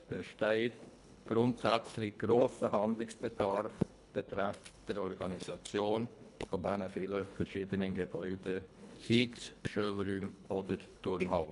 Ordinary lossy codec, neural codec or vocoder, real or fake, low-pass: none; codec, 24 kHz, 1.5 kbps, HILCodec; fake; 10.8 kHz